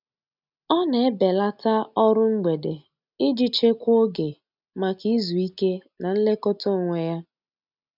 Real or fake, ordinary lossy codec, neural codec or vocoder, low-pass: real; none; none; 5.4 kHz